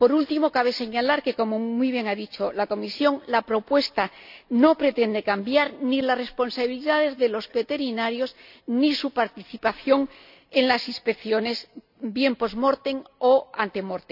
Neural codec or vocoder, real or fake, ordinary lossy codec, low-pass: none; real; none; 5.4 kHz